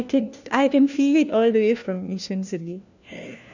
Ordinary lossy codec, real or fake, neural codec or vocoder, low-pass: none; fake; codec, 16 kHz, 1 kbps, FunCodec, trained on LibriTTS, 50 frames a second; 7.2 kHz